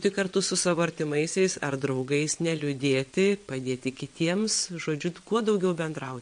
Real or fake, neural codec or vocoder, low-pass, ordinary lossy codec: fake; vocoder, 22.05 kHz, 80 mel bands, WaveNeXt; 9.9 kHz; MP3, 48 kbps